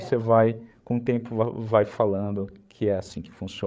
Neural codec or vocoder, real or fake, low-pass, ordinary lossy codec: codec, 16 kHz, 8 kbps, FreqCodec, larger model; fake; none; none